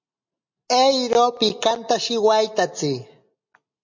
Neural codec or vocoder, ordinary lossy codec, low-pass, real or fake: none; MP3, 48 kbps; 7.2 kHz; real